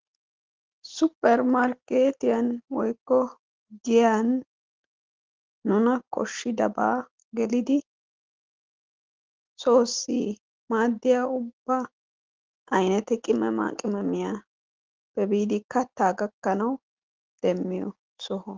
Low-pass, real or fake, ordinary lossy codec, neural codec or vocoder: 7.2 kHz; real; Opus, 16 kbps; none